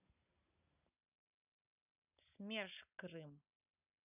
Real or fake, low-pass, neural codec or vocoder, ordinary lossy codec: real; 3.6 kHz; none; none